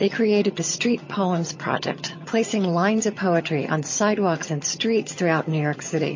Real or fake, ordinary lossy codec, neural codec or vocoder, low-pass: fake; MP3, 32 kbps; vocoder, 22.05 kHz, 80 mel bands, HiFi-GAN; 7.2 kHz